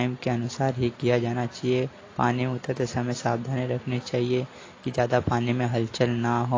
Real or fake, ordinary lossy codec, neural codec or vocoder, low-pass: real; AAC, 32 kbps; none; 7.2 kHz